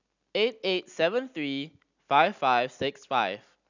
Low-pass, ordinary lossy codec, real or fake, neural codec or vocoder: 7.2 kHz; none; real; none